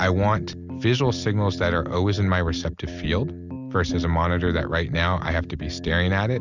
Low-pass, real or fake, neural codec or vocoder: 7.2 kHz; real; none